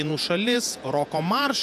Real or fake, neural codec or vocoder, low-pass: fake; vocoder, 48 kHz, 128 mel bands, Vocos; 14.4 kHz